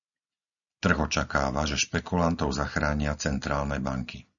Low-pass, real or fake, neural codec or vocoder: 7.2 kHz; real; none